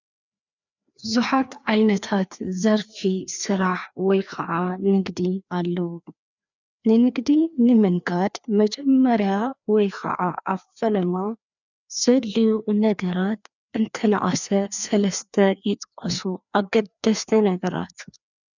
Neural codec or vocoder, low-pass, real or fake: codec, 16 kHz, 2 kbps, FreqCodec, larger model; 7.2 kHz; fake